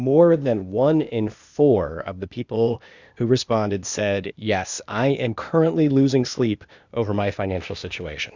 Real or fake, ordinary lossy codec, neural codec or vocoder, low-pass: fake; Opus, 64 kbps; codec, 16 kHz, 0.8 kbps, ZipCodec; 7.2 kHz